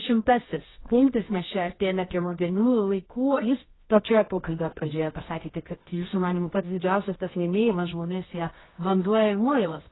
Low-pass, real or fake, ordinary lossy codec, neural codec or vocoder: 7.2 kHz; fake; AAC, 16 kbps; codec, 24 kHz, 0.9 kbps, WavTokenizer, medium music audio release